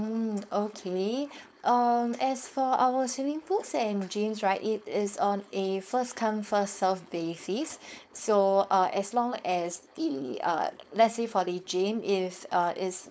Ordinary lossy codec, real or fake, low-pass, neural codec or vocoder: none; fake; none; codec, 16 kHz, 4.8 kbps, FACodec